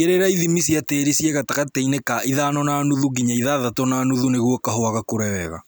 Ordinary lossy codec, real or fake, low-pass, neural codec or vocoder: none; real; none; none